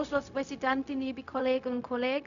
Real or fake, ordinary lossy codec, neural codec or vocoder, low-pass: fake; none; codec, 16 kHz, 0.4 kbps, LongCat-Audio-Codec; 7.2 kHz